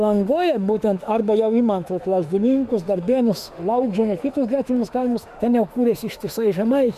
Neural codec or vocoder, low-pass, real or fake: autoencoder, 48 kHz, 32 numbers a frame, DAC-VAE, trained on Japanese speech; 14.4 kHz; fake